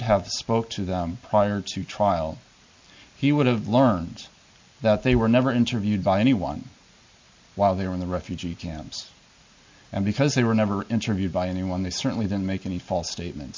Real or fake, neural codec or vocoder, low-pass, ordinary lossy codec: real; none; 7.2 kHz; MP3, 48 kbps